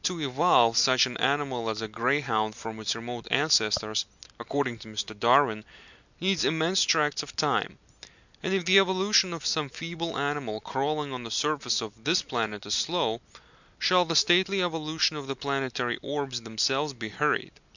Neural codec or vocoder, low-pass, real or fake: none; 7.2 kHz; real